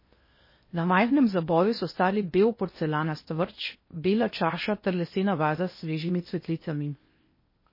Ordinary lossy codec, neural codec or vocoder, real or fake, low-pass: MP3, 24 kbps; codec, 16 kHz in and 24 kHz out, 0.8 kbps, FocalCodec, streaming, 65536 codes; fake; 5.4 kHz